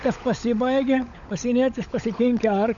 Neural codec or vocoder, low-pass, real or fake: codec, 16 kHz, 16 kbps, FunCodec, trained on LibriTTS, 50 frames a second; 7.2 kHz; fake